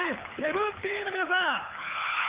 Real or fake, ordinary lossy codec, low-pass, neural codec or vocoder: fake; Opus, 32 kbps; 3.6 kHz; codec, 24 kHz, 6 kbps, HILCodec